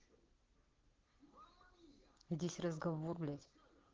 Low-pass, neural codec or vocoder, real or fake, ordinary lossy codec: 7.2 kHz; none; real; Opus, 16 kbps